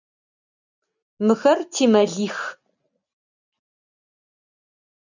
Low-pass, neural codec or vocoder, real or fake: 7.2 kHz; none; real